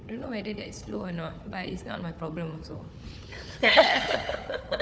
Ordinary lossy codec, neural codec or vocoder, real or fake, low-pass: none; codec, 16 kHz, 4 kbps, FunCodec, trained on Chinese and English, 50 frames a second; fake; none